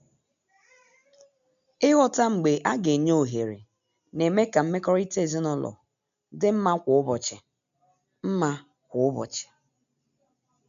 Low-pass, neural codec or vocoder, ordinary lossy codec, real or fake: 7.2 kHz; none; none; real